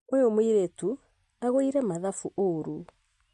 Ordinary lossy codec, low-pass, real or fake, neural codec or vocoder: MP3, 48 kbps; 14.4 kHz; real; none